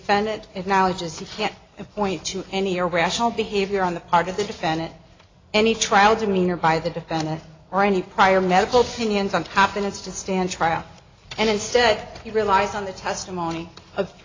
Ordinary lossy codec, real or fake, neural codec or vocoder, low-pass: AAC, 32 kbps; real; none; 7.2 kHz